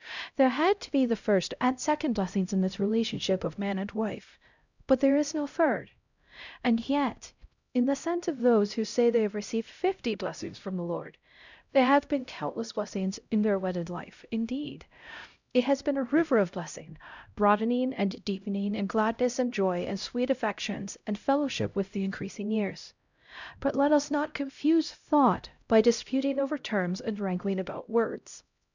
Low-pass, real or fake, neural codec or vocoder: 7.2 kHz; fake; codec, 16 kHz, 0.5 kbps, X-Codec, HuBERT features, trained on LibriSpeech